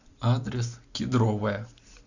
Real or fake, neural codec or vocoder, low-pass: real; none; 7.2 kHz